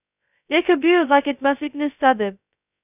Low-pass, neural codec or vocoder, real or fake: 3.6 kHz; codec, 16 kHz, 0.2 kbps, FocalCodec; fake